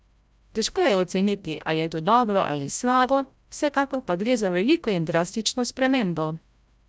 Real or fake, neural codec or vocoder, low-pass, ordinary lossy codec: fake; codec, 16 kHz, 0.5 kbps, FreqCodec, larger model; none; none